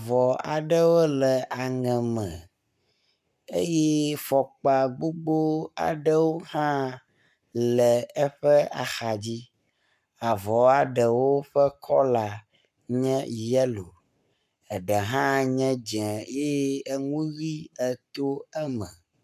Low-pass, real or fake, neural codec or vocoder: 14.4 kHz; fake; codec, 44.1 kHz, 7.8 kbps, Pupu-Codec